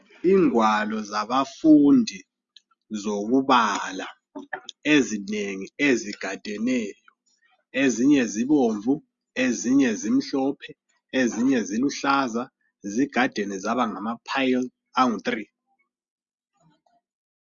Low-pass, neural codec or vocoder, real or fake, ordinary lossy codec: 7.2 kHz; none; real; Opus, 64 kbps